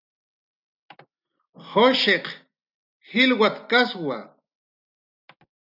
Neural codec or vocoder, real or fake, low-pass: none; real; 5.4 kHz